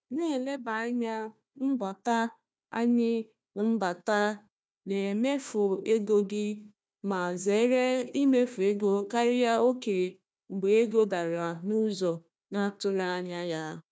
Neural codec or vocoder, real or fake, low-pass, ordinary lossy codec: codec, 16 kHz, 1 kbps, FunCodec, trained on Chinese and English, 50 frames a second; fake; none; none